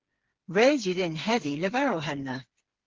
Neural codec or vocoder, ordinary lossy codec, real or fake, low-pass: codec, 16 kHz, 4 kbps, FreqCodec, smaller model; Opus, 16 kbps; fake; 7.2 kHz